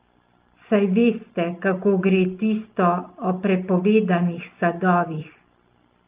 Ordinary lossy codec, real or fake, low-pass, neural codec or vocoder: Opus, 24 kbps; real; 3.6 kHz; none